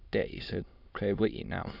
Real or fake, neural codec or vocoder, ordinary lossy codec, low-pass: fake; autoencoder, 22.05 kHz, a latent of 192 numbers a frame, VITS, trained on many speakers; none; 5.4 kHz